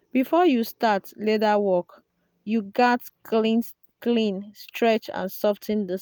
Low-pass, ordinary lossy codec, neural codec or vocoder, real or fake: none; none; none; real